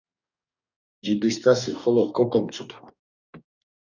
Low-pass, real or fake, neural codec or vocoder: 7.2 kHz; fake; codec, 44.1 kHz, 2.6 kbps, DAC